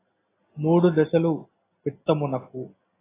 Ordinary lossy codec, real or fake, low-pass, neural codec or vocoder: AAC, 16 kbps; real; 3.6 kHz; none